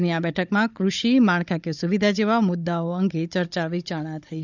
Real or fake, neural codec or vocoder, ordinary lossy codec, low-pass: fake; codec, 16 kHz, 16 kbps, FunCodec, trained on Chinese and English, 50 frames a second; none; 7.2 kHz